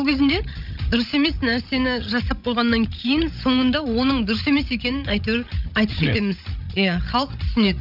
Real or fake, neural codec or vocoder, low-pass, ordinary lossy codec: fake; codec, 16 kHz, 16 kbps, FreqCodec, larger model; 5.4 kHz; none